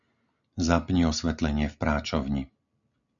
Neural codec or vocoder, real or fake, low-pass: none; real; 7.2 kHz